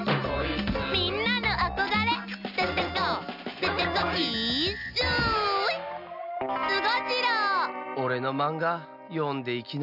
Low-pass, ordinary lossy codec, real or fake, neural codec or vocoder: 5.4 kHz; none; real; none